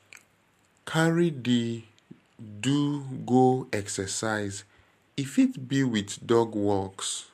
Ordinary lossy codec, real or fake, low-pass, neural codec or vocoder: MP3, 64 kbps; real; 14.4 kHz; none